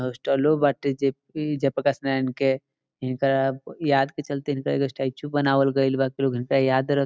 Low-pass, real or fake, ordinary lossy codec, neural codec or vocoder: none; real; none; none